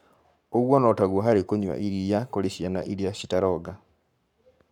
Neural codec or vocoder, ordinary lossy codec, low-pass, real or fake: codec, 44.1 kHz, 7.8 kbps, Pupu-Codec; none; 19.8 kHz; fake